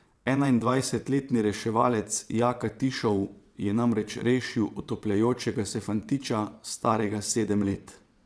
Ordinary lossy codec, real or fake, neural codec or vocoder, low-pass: none; fake; vocoder, 22.05 kHz, 80 mel bands, WaveNeXt; none